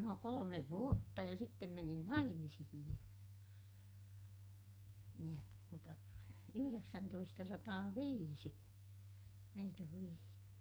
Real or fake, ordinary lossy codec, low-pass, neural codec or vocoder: fake; none; none; codec, 44.1 kHz, 2.6 kbps, SNAC